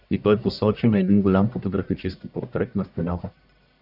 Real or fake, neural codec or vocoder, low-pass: fake; codec, 44.1 kHz, 1.7 kbps, Pupu-Codec; 5.4 kHz